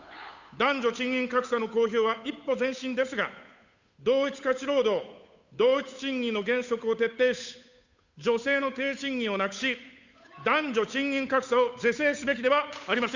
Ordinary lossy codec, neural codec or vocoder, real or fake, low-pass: Opus, 64 kbps; codec, 16 kHz, 8 kbps, FunCodec, trained on Chinese and English, 25 frames a second; fake; 7.2 kHz